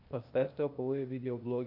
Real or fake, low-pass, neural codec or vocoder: fake; 5.4 kHz; codec, 16 kHz, 0.8 kbps, ZipCodec